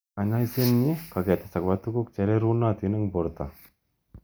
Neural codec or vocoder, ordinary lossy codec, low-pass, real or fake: none; none; none; real